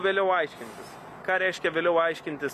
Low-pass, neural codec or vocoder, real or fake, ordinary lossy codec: 14.4 kHz; none; real; AAC, 96 kbps